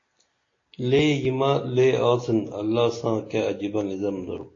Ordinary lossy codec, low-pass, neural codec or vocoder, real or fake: AAC, 32 kbps; 7.2 kHz; none; real